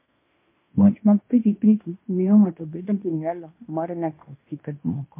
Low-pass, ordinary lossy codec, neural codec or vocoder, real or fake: 3.6 kHz; MP3, 24 kbps; codec, 16 kHz in and 24 kHz out, 0.9 kbps, LongCat-Audio-Codec, fine tuned four codebook decoder; fake